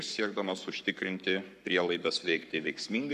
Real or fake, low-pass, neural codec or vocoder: fake; 14.4 kHz; codec, 44.1 kHz, 7.8 kbps, Pupu-Codec